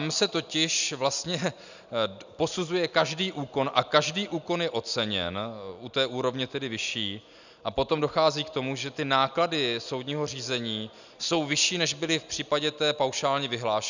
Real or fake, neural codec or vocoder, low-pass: real; none; 7.2 kHz